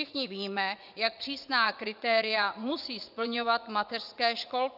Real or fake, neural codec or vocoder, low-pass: fake; vocoder, 44.1 kHz, 80 mel bands, Vocos; 5.4 kHz